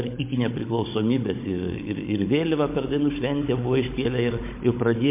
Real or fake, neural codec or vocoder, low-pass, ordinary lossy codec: fake; codec, 16 kHz, 16 kbps, FunCodec, trained on Chinese and English, 50 frames a second; 3.6 kHz; MP3, 24 kbps